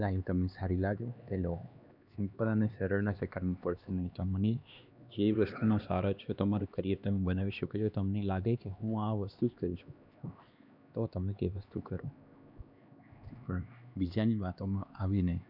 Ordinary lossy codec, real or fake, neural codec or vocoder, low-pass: none; fake; codec, 16 kHz, 2 kbps, X-Codec, HuBERT features, trained on LibriSpeech; 5.4 kHz